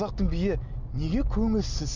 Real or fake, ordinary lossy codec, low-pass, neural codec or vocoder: real; none; 7.2 kHz; none